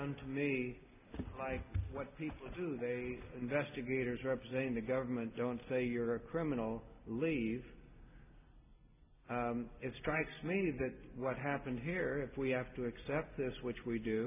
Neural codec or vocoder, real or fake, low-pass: none; real; 3.6 kHz